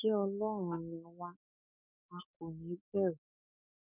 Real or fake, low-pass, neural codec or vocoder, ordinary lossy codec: fake; 3.6 kHz; codec, 44.1 kHz, 7.8 kbps, DAC; none